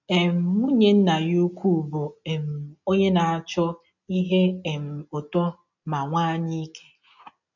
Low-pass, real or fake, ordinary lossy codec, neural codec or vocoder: 7.2 kHz; real; none; none